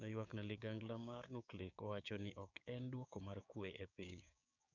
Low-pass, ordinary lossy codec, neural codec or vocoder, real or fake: 7.2 kHz; none; codec, 16 kHz, 6 kbps, DAC; fake